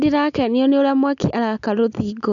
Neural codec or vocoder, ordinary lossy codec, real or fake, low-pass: none; none; real; 7.2 kHz